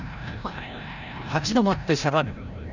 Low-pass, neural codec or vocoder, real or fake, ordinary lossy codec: 7.2 kHz; codec, 16 kHz, 0.5 kbps, FreqCodec, larger model; fake; none